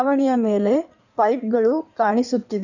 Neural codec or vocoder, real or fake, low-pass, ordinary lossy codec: codec, 16 kHz in and 24 kHz out, 1.1 kbps, FireRedTTS-2 codec; fake; 7.2 kHz; none